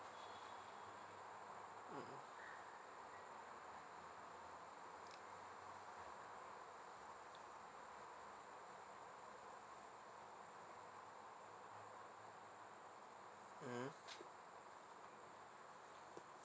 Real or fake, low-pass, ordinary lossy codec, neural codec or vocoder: real; none; none; none